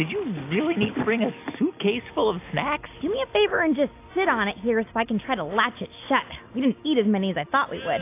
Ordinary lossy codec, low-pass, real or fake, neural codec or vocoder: AAC, 24 kbps; 3.6 kHz; real; none